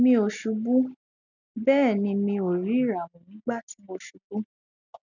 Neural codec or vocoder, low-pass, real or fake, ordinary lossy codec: none; 7.2 kHz; real; none